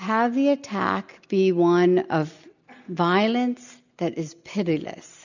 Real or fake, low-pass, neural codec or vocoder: real; 7.2 kHz; none